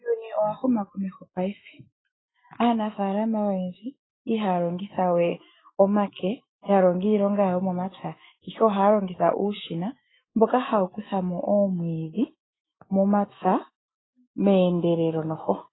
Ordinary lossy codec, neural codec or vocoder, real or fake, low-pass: AAC, 16 kbps; autoencoder, 48 kHz, 128 numbers a frame, DAC-VAE, trained on Japanese speech; fake; 7.2 kHz